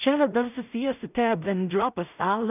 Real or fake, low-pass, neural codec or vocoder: fake; 3.6 kHz; codec, 16 kHz in and 24 kHz out, 0.4 kbps, LongCat-Audio-Codec, two codebook decoder